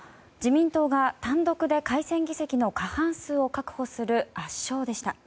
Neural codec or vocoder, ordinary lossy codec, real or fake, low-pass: none; none; real; none